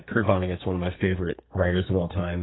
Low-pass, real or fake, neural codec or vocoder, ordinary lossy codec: 7.2 kHz; fake; codec, 44.1 kHz, 2.6 kbps, SNAC; AAC, 16 kbps